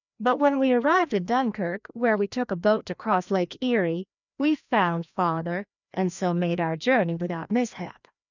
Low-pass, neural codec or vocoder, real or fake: 7.2 kHz; codec, 16 kHz, 1 kbps, FreqCodec, larger model; fake